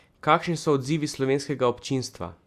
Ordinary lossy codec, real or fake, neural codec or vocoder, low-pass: Opus, 64 kbps; real; none; 14.4 kHz